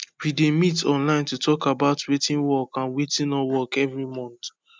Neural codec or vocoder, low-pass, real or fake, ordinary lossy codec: none; none; real; none